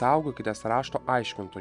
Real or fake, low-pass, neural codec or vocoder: real; 10.8 kHz; none